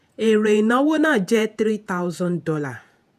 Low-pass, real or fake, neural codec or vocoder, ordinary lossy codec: 14.4 kHz; fake; vocoder, 48 kHz, 128 mel bands, Vocos; none